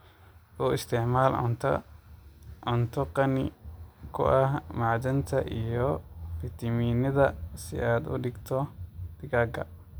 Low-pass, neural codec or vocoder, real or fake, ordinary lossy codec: none; none; real; none